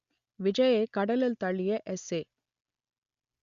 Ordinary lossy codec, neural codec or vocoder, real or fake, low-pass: Opus, 64 kbps; none; real; 7.2 kHz